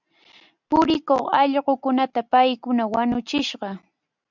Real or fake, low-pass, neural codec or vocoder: real; 7.2 kHz; none